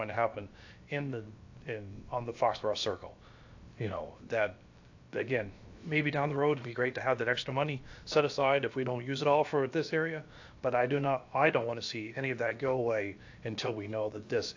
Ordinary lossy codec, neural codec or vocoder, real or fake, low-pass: AAC, 48 kbps; codec, 16 kHz, about 1 kbps, DyCAST, with the encoder's durations; fake; 7.2 kHz